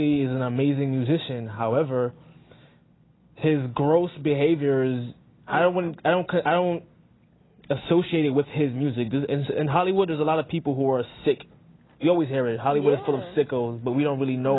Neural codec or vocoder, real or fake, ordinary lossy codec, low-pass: none; real; AAC, 16 kbps; 7.2 kHz